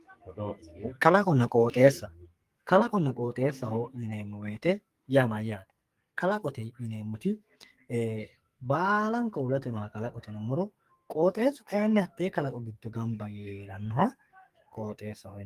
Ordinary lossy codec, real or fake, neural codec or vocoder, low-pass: Opus, 32 kbps; fake; codec, 44.1 kHz, 2.6 kbps, SNAC; 14.4 kHz